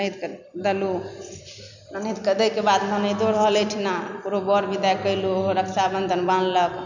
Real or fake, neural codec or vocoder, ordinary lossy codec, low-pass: real; none; none; 7.2 kHz